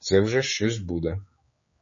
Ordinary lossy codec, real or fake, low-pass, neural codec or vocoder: MP3, 32 kbps; fake; 7.2 kHz; codec, 16 kHz, 4 kbps, X-Codec, HuBERT features, trained on general audio